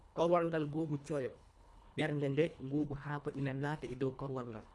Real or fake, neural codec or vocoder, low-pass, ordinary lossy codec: fake; codec, 24 kHz, 1.5 kbps, HILCodec; none; none